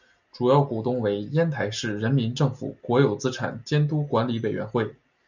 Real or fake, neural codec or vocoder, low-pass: real; none; 7.2 kHz